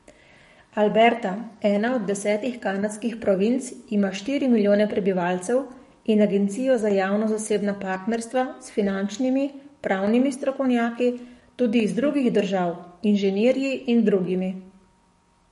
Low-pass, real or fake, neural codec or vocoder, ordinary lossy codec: 19.8 kHz; fake; codec, 44.1 kHz, 7.8 kbps, DAC; MP3, 48 kbps